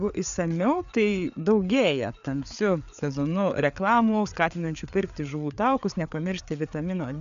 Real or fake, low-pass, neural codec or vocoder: fake; 7.2 kHz; codec, 16 kHz, 4 kbps, FreqCodec, larger model